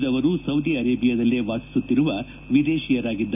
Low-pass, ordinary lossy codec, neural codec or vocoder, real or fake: 3.6 kHz; none; none; real